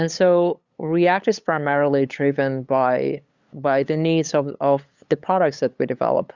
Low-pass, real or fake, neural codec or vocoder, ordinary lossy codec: 7.2 kHz; fake; codec, 16 kHz, 8 kbps, FunCodec, trained on LibriTTS, 25 frames a second; Opus, 64 kbps